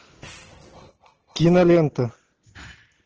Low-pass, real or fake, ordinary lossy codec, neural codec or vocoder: 7.2 kHz; real; Opus, 16 kbps; none